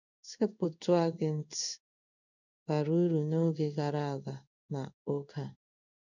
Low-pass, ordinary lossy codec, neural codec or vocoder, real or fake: 7.2 kHz; none; codec, 16 kHz in and 24 kHz out, 1 kbps, XY-Tokenizer; fake